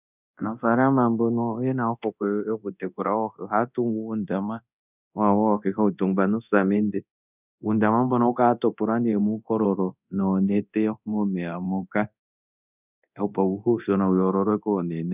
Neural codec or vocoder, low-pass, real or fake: codec, 24 kHz, 0.9 kbps, DualCodec; 3.6 kHz; fake